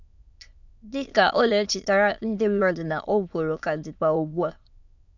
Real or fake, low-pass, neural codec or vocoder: fake; 7.2 kHz; autoencoder, 22.05 kHz, a latent of 192 numbers a frame, VITS, trained on many speakers